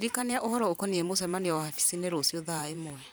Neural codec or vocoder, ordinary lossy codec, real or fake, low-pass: vocoder, 44.1 kHz, 128 mel bands every 512 samples, BigVGAN v2; none; fake; none